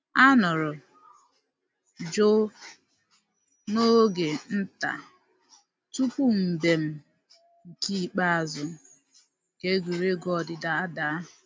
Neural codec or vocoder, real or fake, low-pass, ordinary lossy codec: none; real; none; none